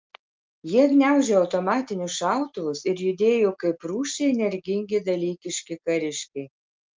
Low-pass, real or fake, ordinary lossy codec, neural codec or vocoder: 7.2 kHz; real; Opus, 24 kbps; none